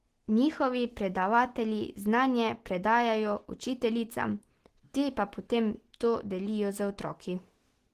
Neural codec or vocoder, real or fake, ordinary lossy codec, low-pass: none; real; Opus, 16 kbps; 14.4 kHz